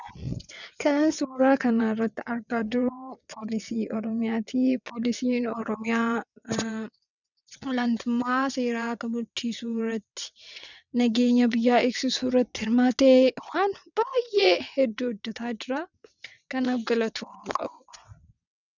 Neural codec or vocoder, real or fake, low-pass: vocoder, 22.05 kHz, 80 mel bands, WaveNeXt; fake; 7.2 kHz